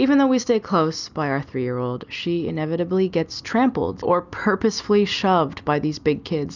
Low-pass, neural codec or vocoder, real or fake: 7.2 kHz; none; real